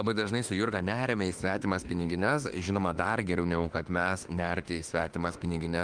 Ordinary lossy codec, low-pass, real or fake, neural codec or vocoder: Opus, 32 kbps; 9.9 kHz; fake; autoencoder, 48 kHz, 32 numbers a frame, DAC-VAE, trained on Japanese speech